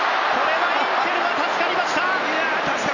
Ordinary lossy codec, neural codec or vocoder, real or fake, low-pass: none; none; real; 7.2 kHz